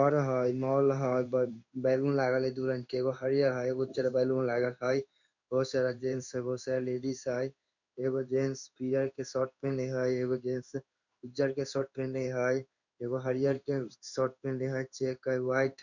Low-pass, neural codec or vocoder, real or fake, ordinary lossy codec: 7.2 kHz; codec, 16 kHz in and 24 kHz out, 1 kbps, XY-Tokenizer; fake; none